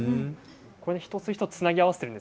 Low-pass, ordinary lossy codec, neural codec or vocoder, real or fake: none; none; none; real